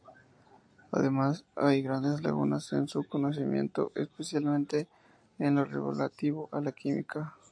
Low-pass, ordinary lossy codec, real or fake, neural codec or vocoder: 9.9 kHz; AAC, 64 kbps; real; none